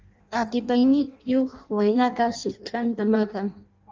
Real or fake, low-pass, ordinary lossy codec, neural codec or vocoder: fake; 7.2 kHz; Opus, 32 kbps; codec, 16 kHz in and 24 kHz out, 0.6 kbps, FireRedTTS-2 codec